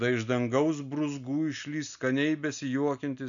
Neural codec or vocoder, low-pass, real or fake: none; 7.2 kHz; real